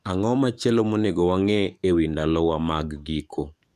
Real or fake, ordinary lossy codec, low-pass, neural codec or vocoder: fake; none; 14.4 kHz; codec, 44.1 kHz, 7.8 kbps, DAC